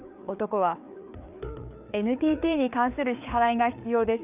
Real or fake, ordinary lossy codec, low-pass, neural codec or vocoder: fake; none; 3.6 kHz; codec, 16 kHz, 4 kbps, FreqCodec, larger model